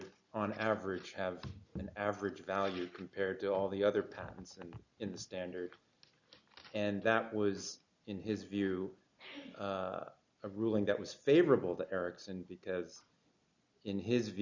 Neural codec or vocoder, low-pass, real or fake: none; 7.2 kHz; real